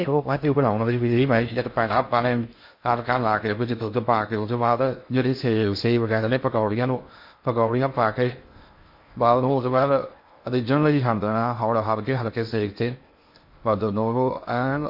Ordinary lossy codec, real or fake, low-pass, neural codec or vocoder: MP3, 32 kbps; fake; 5.4 kHz; codec, 16 kHz in and 24 kHz out, 0.6 kbps, FocalCodec, streaming, 4096 codes